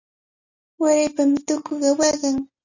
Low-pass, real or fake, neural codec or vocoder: 7.2 kHz; real; none